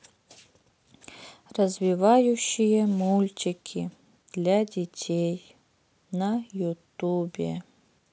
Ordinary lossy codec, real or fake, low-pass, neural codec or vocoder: none; real; none; none